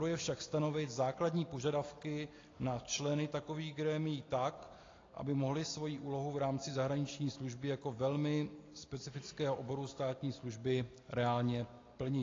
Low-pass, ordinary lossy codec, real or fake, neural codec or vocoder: 7.2 kHz; AAC, 32 kbps; real; none